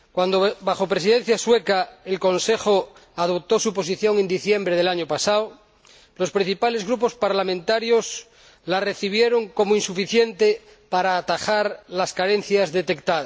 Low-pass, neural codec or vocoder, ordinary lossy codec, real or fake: none; none; none; real